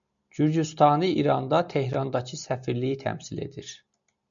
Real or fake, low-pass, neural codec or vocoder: real; 7.2 kHz; none